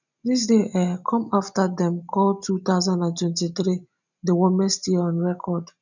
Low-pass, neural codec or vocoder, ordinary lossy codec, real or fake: 7.2 kHz; none; none; real